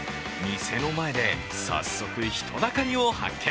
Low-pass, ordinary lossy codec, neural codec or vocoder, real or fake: none; none; none; real